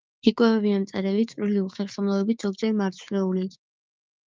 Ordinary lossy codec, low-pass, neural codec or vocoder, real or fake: Opus, 24 kbps; 7.2 kHz; codec, 16 kHz, 6 kbps, DAC; fake